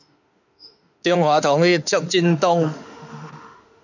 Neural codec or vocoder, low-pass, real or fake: autoencoder, 48 kHz, 32 numbers a frame, DAC-VAE, trained on Japanese speech; 7.2 kHz; fake